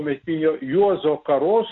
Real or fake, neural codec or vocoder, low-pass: real; none; 7.2 kHz